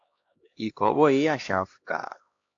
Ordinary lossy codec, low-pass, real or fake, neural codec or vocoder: AAC, 48 kbps; 7.2 kHz; fake; codec, 16 kHz, 1 kbps, X-Codec, HuBERT features, trained on LibriSpeech